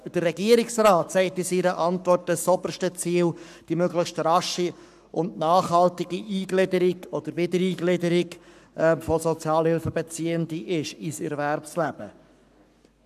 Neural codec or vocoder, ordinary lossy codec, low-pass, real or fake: codec, 44.1 kHz, 7.8 kbps, Pupu-Codec; none; 14.4 kHz; fake